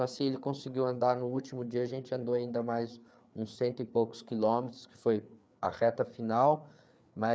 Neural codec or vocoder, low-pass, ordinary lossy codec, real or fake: codec, 16 kHz, 4 kbps, FreqCodec, larger model; none; none; fake